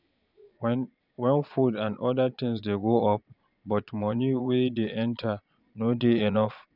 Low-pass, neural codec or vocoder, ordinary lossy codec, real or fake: 5.4 kHz; vocoder, 22.05 kHz, 80 mel bands, Vocos; none; fake